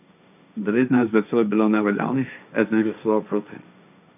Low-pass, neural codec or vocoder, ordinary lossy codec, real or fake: 3.6 kHz; codec, 16 kHz, 1.1 kbps, Voila-Tokenizer; none; fake